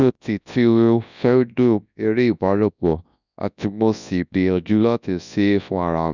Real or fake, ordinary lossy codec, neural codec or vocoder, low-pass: fake; none; codec, 24 kHz, 0.9 kbps, WavTokenizer, large speech release; 7.2 kHz